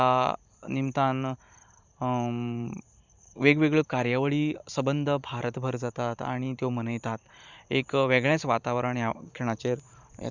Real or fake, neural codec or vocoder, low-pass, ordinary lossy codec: fake; vocoder, 44.1 kHz, 128 mel bands every 512 samples, BigVGAN v2; 7.2 kHz; Opus, 64 kbps